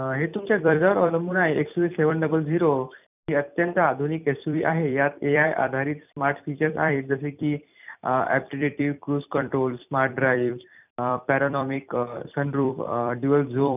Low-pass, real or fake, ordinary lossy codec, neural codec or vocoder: 3.6 kHz; fake; none; vocoder, 44.1 kHz, 128 mel bands every 256 samples, BigVGAN v2